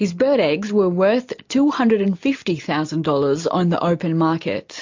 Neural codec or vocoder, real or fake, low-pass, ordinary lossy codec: none; real; 7.2 kHz; MP3, 48 kbps